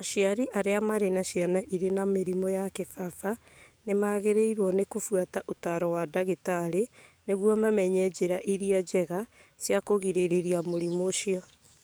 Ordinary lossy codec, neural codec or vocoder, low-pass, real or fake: none; codec, 44.1 kHz, 7.8 kbps, DAC; none; fake